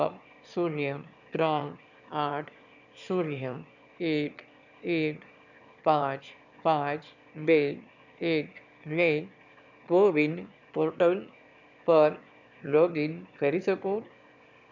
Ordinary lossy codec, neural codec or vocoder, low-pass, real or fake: none; autoencoder, 22.05 kHz, a latent of 192 numbers a frame, VITS, trained on one speaker; 7.2 kHz; fake